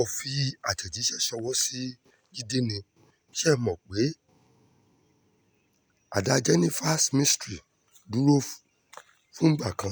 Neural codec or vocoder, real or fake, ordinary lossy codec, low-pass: none; real; none; none